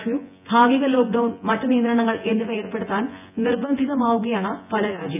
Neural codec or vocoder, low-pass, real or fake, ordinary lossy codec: vocoder, 24 kHz, 100 mel bands, Vocos; 3.6 kHz; fake; none